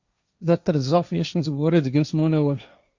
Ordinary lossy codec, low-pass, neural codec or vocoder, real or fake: Opus, 64 kbps; 7.2 kHz; codec, 16 kHz, 1.1 kbps, Voila-Tokenizer; fake